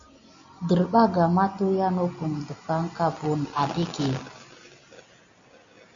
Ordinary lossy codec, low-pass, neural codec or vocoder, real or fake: MP3, 64 kbps; 7.2 kHz; none; real